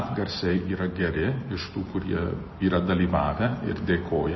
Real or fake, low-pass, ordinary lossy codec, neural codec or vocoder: real; 7.2 kHz; MP3, 24 kbps; none